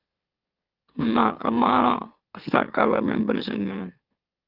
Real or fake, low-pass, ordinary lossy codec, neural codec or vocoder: fake; 5.4 kHz; Opus, 24 kbps; autoencoder, 44.1 kHz, a latent of 192 numbers a frame, MeloTTS